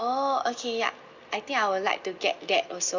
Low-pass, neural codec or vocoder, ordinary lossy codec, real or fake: 7.2 kHz; none; Opus, 64 kbps; real